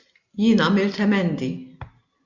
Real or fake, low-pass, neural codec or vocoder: real; 7.2 kHz; none